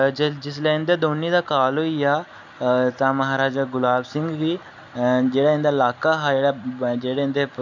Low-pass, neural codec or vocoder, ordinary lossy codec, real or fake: 7.2 kHz; none; none; real